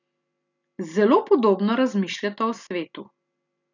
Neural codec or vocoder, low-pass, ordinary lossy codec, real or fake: none; 7.2 kHz; none; real